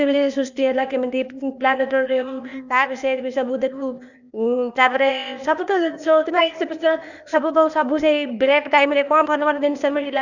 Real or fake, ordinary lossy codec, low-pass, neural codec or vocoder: fake; none; 7.2 kHz; codec, 16 kHz, 0.8 kbps, ZipCodec